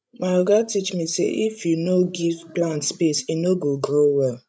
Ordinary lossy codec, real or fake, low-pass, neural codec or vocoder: none; fake; none; codec, 16 kHz, 16 kbps, FreqCodec, larger model